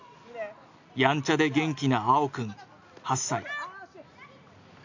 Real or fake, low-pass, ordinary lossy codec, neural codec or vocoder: real; 7.2 kHz; AAC, 48 kbps; none